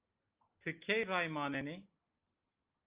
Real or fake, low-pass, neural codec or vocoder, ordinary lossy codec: real; 3.6 kHz; none; Opus, 24 kbps